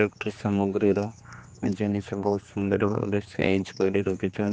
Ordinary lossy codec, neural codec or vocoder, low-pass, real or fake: none; codec, 16 kHz, 2 kbps, X-Codec, HuBERT features, trained on general audio; none; fake